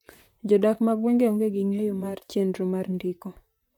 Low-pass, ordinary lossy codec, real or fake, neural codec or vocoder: 19.8 kHz; none; fake; vocoder, 44.1 kHz, 128 mel bands, Pupu-Vocoder